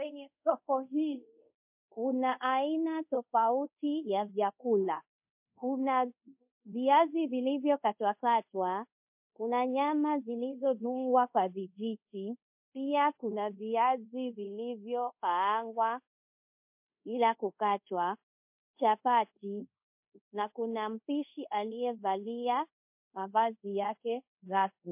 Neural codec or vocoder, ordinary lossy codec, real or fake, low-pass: codec, 24 kHz, 0.5 kbps, DualCodec; MP3, 32 kbps; fake; 3.6 kHz